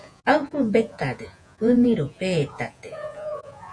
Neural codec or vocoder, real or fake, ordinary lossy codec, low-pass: vocoder, 48 kHz, 128 mel bands, Vocos; fake; MP3, 64 kbps; 9.9 kHz